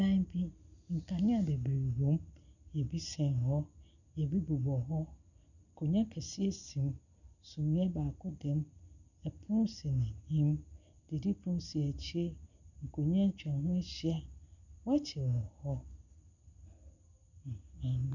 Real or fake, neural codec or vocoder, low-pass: real; none; 7.2 kHz